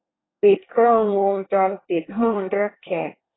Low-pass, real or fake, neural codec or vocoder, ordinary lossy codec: 7.2 kHz; fake; codec, 24 kHz, 1 kbps, SNAC; AAC, 16 kbps